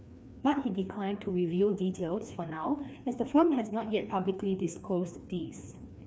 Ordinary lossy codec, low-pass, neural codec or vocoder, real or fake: none; none; codec, 16 kHz, 2 kbps, FreqCodec, larger model; fake